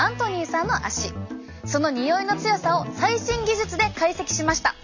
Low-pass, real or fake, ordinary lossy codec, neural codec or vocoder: 7.2 kHz; real; none; none